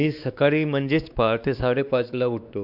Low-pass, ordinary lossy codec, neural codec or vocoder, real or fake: 5.4 kHz; none; codec, 16 kHz, 2 kbps, X-Codec, WavLM features, trained on Multilingual LibriSpeech; fake